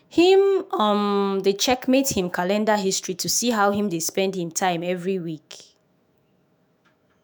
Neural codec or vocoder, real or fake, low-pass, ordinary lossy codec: autoencoder, 48 kHz, 128 numbers a frame, DAC-VAE, trained on Japanese speech; fake; none; none